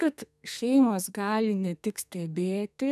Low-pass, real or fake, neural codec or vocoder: 14.4 kHz; fake; codec, 32 kHz, 1.9 kbps, SNAC